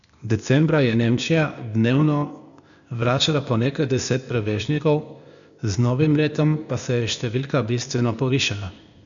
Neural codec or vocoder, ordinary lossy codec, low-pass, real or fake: codec, 16 kHz, 0.8 kbps, ZipCodec; none; 7.2 kHz; fake